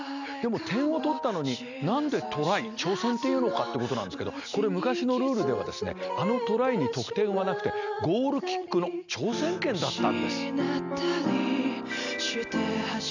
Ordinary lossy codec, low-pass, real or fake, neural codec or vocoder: none; 7.2 kHz; real; none